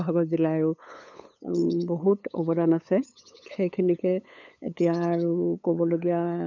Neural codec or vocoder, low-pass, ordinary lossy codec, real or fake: codec, 16 kHz, 8 kbps, FunCodec, trained on LibriTTS, 25 frames a second; 7.2 kHz; none; fake